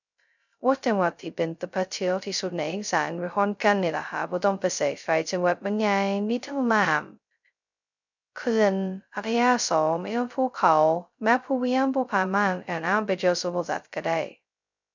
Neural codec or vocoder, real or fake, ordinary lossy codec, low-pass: codec, 16 kHz, 0.2 kbps, FocalCodec; fake; none; 7.2 kHz